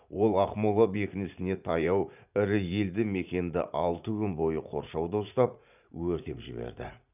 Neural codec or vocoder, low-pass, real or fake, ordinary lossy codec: vocoder, 44.1 kHz, 80 mel bands, Vocos; 3.6 kHz; fake; none